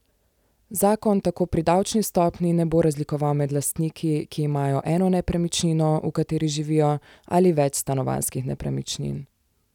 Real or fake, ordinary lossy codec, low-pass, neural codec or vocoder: real; none; 19.8 kHz; none